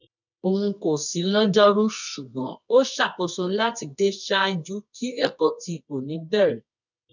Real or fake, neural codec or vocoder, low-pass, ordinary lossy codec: fake; codec, 24 kHz, 0.9 kbps, WavTokenizer, medium music audio release; 7.2 kHz; none